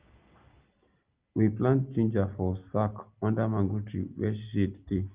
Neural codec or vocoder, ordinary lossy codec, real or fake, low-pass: none; Opus, 24 kbps; real; 3.6 kHz